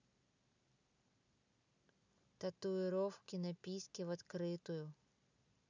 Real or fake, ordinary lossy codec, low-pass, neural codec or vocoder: real; none; 7.2 kHz; none